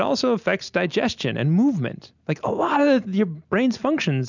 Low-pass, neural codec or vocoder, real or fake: 7.2 kHz; none; real